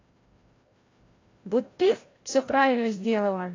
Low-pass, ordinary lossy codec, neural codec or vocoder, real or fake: 7.2 kHz; AAC, 32 kbps; codec, 16 kHz, 0.5 kbps, FreqCodec, larger model; fake